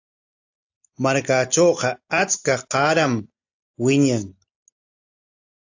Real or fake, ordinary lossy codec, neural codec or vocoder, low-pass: real; AAC, 48 kbps; none; 7.2 kHz